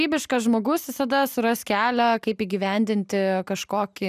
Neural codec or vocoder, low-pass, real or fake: none; 14.4 kHz; real